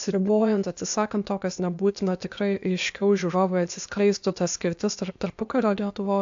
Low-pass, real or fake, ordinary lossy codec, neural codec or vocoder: 7.2 kHz; fake; AAC, 64 kbps; codec, 16 kHz, 0.8 kbps, ZipCodec